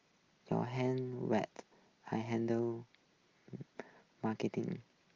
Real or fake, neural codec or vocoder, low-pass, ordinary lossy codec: real; none; 7.2 kHz; Opus, 32 kbps